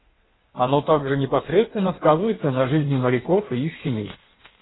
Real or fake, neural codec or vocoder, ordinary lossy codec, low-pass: fake; codec, 16 kHz in and 24 kHz out, 1.1 kbps, FireRedTTS-2 codec; AAC, 16 kbps; 7.2 kHz